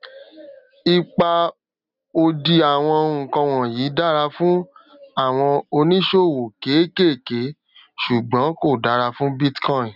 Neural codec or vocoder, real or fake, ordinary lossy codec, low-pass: none; real; none; 5.4 kHz